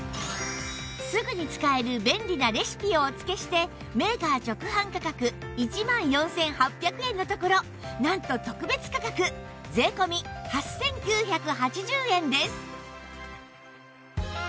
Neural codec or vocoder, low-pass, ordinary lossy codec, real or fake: none; none; none; real